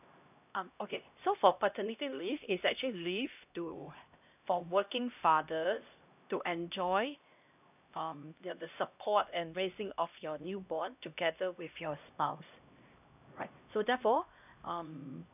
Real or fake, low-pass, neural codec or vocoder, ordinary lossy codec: fake; 3.6 kHz; codec, 16 kHz, 1 kbps, X-Codec, HuBERT features, trained on LibriSpeech; none